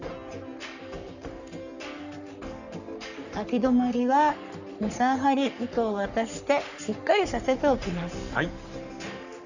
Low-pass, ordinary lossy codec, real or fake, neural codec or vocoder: 7.2 kHz; none; fake; codec, 44.1 kHz, 3.4 kbps, Pupu-Codec